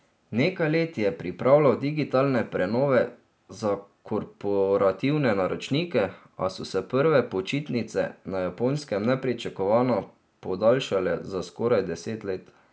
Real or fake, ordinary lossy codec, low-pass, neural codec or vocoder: real; none; none; none